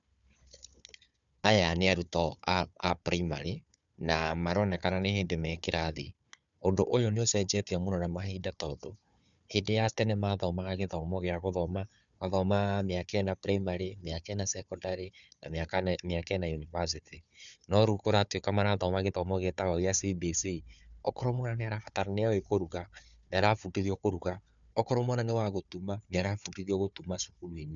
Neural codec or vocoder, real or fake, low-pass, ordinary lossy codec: codec, 16 kHz, 4 kbps, FunCodec, trained on Chinese and English, 50 frames a second; fake; 7.2 kHz; none